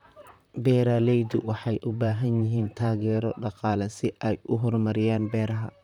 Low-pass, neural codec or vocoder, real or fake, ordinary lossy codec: 19.8 kHz; vocoder, 44.1 kHz, 128 mel bands, Pupu-Vocoder; fake; none